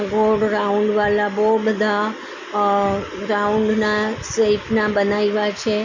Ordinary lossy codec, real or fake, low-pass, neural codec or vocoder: Opus, 64 kbps; real; 7.2 kHz; none